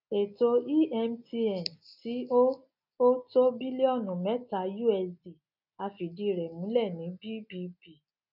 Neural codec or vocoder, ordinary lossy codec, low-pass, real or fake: none; none; 5.4 kHz; real